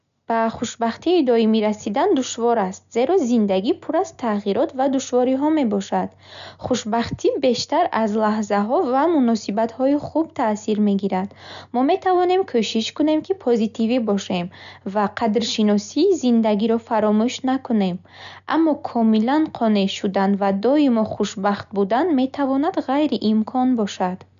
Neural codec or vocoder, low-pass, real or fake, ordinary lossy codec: none; 7.2 kHz; real; none